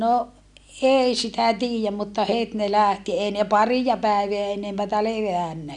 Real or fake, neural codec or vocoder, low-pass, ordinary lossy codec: real; none; 10.8 kHz; none